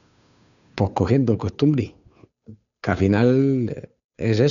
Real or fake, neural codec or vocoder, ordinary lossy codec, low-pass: fake; codec, 16 kHz, 2 kbps, FunCodec, trained on Chinese and English, 25 frames a second; none; 7.2 kHz